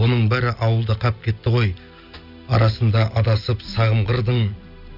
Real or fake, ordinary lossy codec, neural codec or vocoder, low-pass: real; none; none; 5.4 kHz